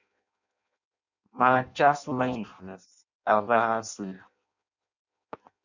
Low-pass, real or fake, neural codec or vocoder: 7.2 kHz; fake; codec, 16 kHz in and 24 kHz out, 0.6 kbps, FireRedTTS-2 codec